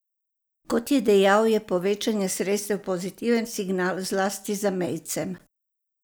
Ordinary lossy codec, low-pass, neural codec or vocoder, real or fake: none; none; none; real